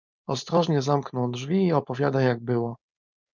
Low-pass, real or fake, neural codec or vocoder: 7.2 kHz; fake; codec, 16 kHz, 4.8 kbps, FACodec